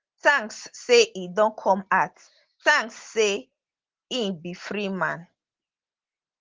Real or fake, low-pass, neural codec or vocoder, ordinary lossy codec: real; 7.2 kHz; none; Opus, 16 kbps